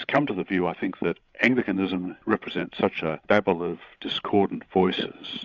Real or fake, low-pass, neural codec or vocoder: fake; 7.2 kHz; codec, 16 kHz, 16 kbps, FreqCodec, larger model